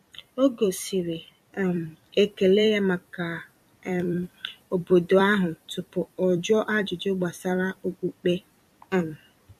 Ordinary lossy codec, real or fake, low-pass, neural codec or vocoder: MP3, 64 kbps; fake; 14.4 kHz; vocoder, 44.1 kHz, 128 mel bands every 256 samples, BigVGAN v2